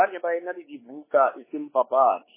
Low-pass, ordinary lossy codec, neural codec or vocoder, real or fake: 3.6 kHz; MP3, 16 kbps; codec, 16 kHz, 4 kbps, X-Codec, WavLM features, trained on Multilingual LibriSpeech; fake